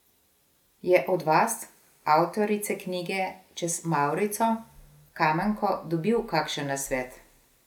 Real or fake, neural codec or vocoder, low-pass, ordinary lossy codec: real; none; 19.8 kHz; none